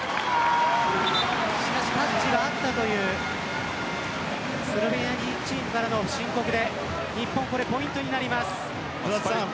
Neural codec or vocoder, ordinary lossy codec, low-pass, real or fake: none; none; none; real